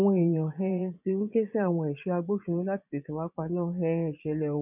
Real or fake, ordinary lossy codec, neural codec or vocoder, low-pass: fake; none; vocoder, 22.05 kHz, 80 mel bands, Vocos; 3.6 kHz